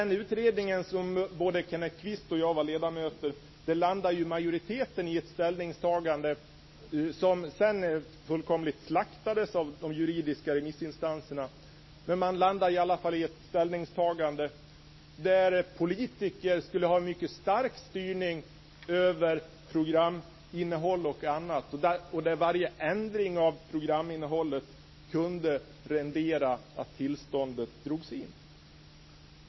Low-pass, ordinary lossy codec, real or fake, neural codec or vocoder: 7.2 kHz; MP3, 24 kbps; real; none